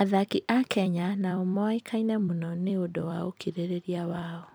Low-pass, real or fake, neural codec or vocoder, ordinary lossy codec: none; fake; vocoder, 44.1 kHz, 128 mel bands every 256 samples, BigVGAN v2; none